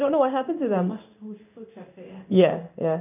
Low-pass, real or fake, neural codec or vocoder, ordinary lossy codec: 3.6 kHz; fake; codec, 16 kHz in and 24 kHz out, 1 kbps, XY-Tokenizer; none